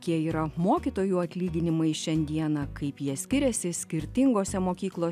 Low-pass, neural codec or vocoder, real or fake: 14.4 kHz; none; real